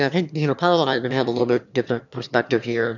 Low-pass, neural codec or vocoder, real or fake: 7.2 kHz; autoencoder, 22.05 kHz, a latent of 192 numbers a frame, VITS, trained on one speaker; fake